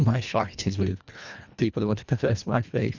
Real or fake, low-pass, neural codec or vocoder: fake; 7.2 kHz; codec, 24 kHz, 1.5 kbps, HILCodec